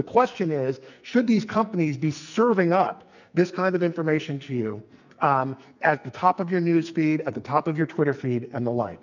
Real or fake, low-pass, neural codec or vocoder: fake; 7.2 kHz; codec, 44.1 kHz, 2.6 kbps, SNAC